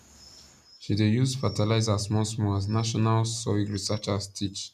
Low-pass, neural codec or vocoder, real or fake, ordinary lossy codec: 14.4 kHz; none; real; none